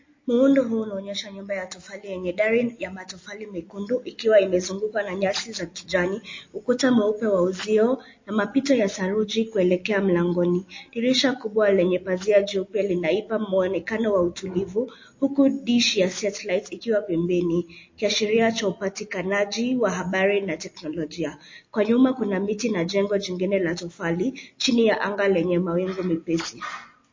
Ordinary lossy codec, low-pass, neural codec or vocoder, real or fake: MP3, 32 kbps; 7.2 kHz; none; real